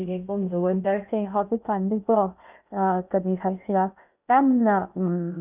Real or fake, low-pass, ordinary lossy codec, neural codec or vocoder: fake; 3.6 kHz; none; codec, 16 kHz in and 24 kHz out, 0.6 kbps, FocalCodec, streaming, 2048 codes